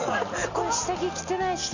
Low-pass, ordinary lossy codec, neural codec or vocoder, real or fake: 7.2 kHz; none; none; real